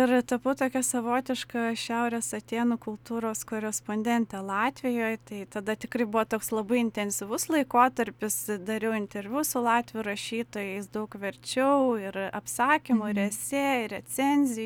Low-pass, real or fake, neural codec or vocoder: 19.8 kHz; real; none